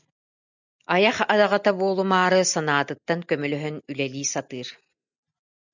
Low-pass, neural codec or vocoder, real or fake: 7.2 kHz; none; real